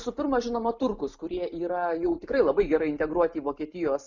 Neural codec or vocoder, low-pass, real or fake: none; 7.2 kHz; real